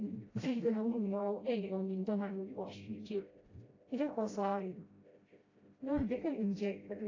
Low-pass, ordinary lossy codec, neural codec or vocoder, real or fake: 7.2 kHz; AAC, 32 kbps; codec, 16 kHz, 0.5 kbps, FreqCodec, smaller model; fake